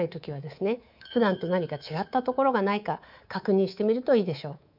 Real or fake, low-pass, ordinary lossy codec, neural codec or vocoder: fake; 5.4 kHz; none; codec, 24 kHz, 3.1 kbps, DualCodec